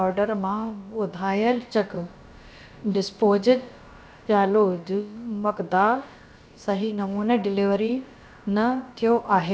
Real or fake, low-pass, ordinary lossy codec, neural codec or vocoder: fake; none; none; codec, 16 kHz, about 1 kbps, DyCAST, with the encoder's durations